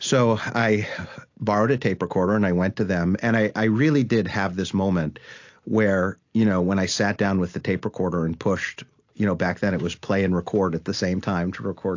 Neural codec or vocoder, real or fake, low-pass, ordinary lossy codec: none; real; 7.2 kHz; AAC, 48 kbps